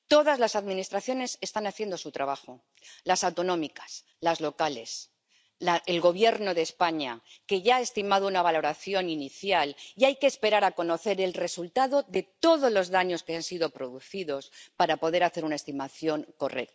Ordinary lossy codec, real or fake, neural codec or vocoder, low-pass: none; real; none; none